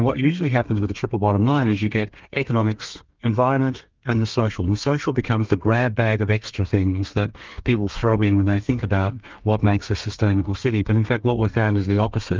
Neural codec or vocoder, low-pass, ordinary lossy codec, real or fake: codec, 32 kHz, 1.9 kbps, SNAC; 7.2 kHz; Opus, 24 kbps; fake